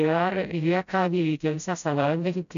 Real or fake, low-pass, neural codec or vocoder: fake; 7.2 kHz; codec, 16 kHz, 0.5 kbps, FreqCodec, smaller model